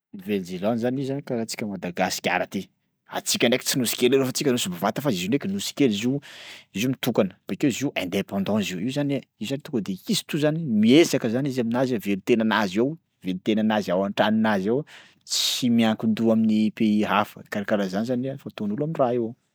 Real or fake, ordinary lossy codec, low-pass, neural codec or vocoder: real; none; none; none